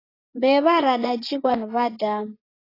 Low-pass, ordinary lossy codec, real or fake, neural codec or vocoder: 5.4 kHz; AAC, 24 kbps; fake; vocoder, 24 kHz, 100 mel bands, Vocos